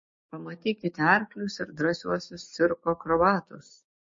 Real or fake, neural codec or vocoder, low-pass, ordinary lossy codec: fake; codec, 16 kHz, 6 kbps, DAC; 7.2 kHz; MP3, 32 kbps